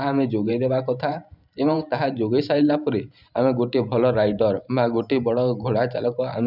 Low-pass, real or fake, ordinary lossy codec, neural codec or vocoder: 5.4 kHz; fake; none; vocoder, 44.1 kHz, 128 mel bands every 512 samples, BigVGAN v2